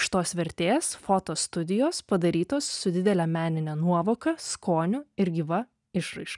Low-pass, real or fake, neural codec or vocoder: 10.8 kHz; real; none